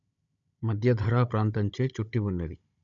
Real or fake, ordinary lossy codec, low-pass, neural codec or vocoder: fake; none; 7.2 kHz; codec, 16 kHz, 16 kbps, FunCodec, trained on Chinese and English, 50 frames a second